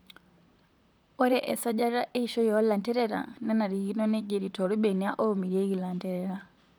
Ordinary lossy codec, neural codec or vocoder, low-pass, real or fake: none; vocoder, 44.1 kHz, 128 mel bands, Pupu-Vocoder; none; fake